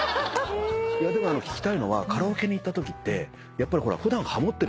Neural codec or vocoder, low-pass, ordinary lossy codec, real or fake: none; none; none; real